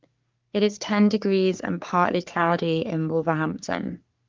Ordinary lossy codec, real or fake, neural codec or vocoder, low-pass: Opus, 24 kbps; fake; codec, 44.1 kHz, 3.4 kbps, Pupu-Codec; 7.2 kHz